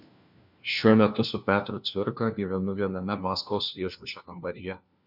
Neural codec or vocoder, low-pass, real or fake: codec, 16 kHz, 1 kbps, FunCodec, trained on LibriTTS, 50 frames a second; 5.4 kHz; fake